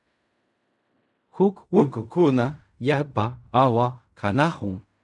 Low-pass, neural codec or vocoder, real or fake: 10.8 kHz; codec, 16 kHz in and 24 kHz out, 0.4 kbps, LongCat-Audio-Codec, fine tuned four codebook decoder; fake